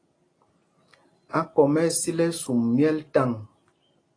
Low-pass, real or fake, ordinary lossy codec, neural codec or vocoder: 9.9 kHz; real; AAC, 32 kbps; none